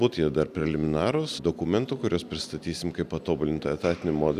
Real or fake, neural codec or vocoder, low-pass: real; none; 14.4 kHz